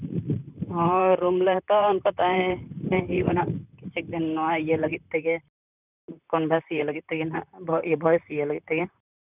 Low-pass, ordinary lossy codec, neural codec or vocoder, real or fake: 3.6 kHz; none; vocoder, 44.1 kHz, 128 mel bands, Pupu-Vocoder; fake